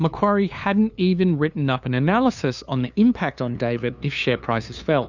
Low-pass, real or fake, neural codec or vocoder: 7.2 kHz; fake; codec, 16 kHz, 2 kbps, FunCodec, trained on LibriTTS, 25 frames a second